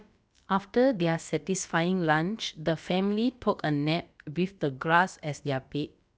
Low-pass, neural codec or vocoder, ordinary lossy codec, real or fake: none; codec, 16 kHz, about 1 kbps, DyCAST, with the encoder's durations; none; fake